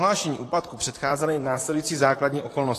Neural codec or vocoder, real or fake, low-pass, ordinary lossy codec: vocoder, 44.1 kHz, 128 mel bands, Pupu-Vocoder; fake; 14.4 kHz; AAC, 48 kbps